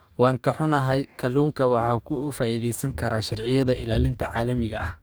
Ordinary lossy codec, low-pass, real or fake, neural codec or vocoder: none; none; fake; codec, 44.1 kHz, 2.6 kbps, DAC